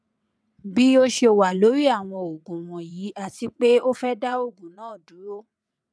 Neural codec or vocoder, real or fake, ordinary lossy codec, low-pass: vocoder, 22.05 kHz, 80 mel bands, WaveNeXt; fake; none; none